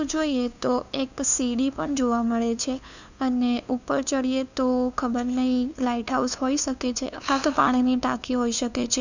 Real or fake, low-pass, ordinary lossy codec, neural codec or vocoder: fake; 7.2 kHz; none; codec, 16 kHz, 2 kbps, FunCodec, trained on LibriTTS, 25 frames a second